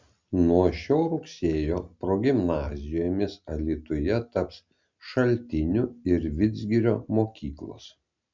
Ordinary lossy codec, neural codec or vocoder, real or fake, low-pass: MP3, 64 kbps; none; real; 7.2 kHz